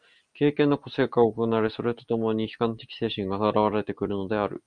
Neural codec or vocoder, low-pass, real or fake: none; 9.9 kHz; real